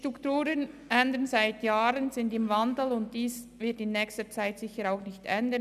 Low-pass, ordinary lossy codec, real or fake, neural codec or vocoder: 14.4 kHz; none; real; none